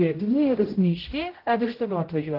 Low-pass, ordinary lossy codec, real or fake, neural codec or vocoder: 5.4 kHz; Opus, 16 kbps; fake; codec, 16 kHz, 0.5 kbps, X-Codec, HuBERT features, trained on general audio